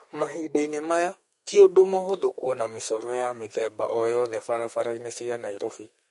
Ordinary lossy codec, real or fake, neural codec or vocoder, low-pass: MP3, 48 kbps; fake; codec, 32 kHz, 1.9 kbps, SNAC; 14.4 kHz